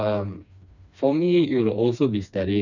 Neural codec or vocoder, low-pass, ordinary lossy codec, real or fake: codec, 16 kHz, 2 kbps, FreqCodec, smaller model; 7.2 kHz; none; fake